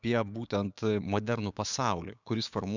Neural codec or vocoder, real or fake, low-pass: codec, 16 kHz, 6 kbps, DAC; fake; 7.2 kHz